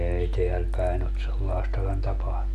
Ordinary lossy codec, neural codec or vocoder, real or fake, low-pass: MP3, 96 kbps; none; real; 14.4 kHz